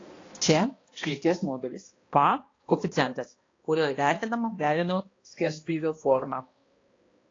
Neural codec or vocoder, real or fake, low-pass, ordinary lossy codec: codec, 16 kHz, 1 kbps, X-Codec, HuBERT features, trained on balanced general audio; fake; 7.2 kHz; AAC, 32 kbps